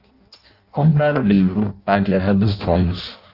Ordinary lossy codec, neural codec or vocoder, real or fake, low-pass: Opus, 24 kbps; codec, 16 kHz in and 24 kHz out, 0.6 kbps, FireRedTTS-2 codec; fake; 5.4 kHz